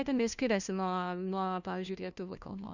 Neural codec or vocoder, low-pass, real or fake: codec, 16 kHz, 1 kbps, FunCodec, trained on LibriTTS, 50 frames a second; 7.2 kHz; fake